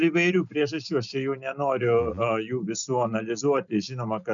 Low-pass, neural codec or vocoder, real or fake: 7.2 kHz; none; real